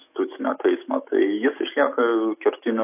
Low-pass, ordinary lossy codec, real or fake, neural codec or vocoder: 3.6 kHz; AAC, 32 kbps; fake; autoencoder, 48 kHz, 128 numbers a frame, DAC-VAE, trained on Japanese speech